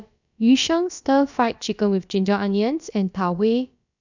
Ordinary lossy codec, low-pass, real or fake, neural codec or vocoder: none; 7.2 kHz; fake; codec, 16 kHz, about 1 kbps, DyCAST, with the encoder's durations